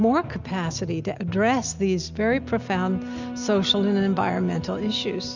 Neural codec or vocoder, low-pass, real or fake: none; 7.2 kHz; real